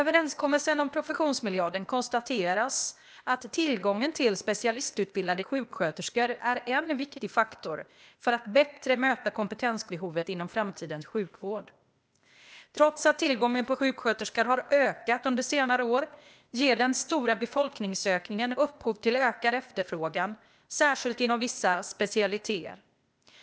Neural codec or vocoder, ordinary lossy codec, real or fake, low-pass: codec, 16 kHz, 0.8 kbps, ZipCodec; none; fake; none